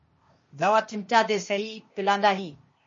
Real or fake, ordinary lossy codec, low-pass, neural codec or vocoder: fake; MP3, 32 kbps; 7.2 kHz; codec, 16 kHz, 0.8 kbps, ZipCodec